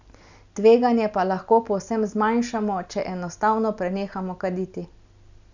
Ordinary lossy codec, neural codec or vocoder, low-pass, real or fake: none; none; 7.2 kHz; real